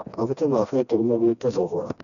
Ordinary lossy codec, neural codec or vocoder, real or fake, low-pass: none; codec, 16 kHz, 1 kbps, FreqCodec, smaller model; fake; 7.2 kHz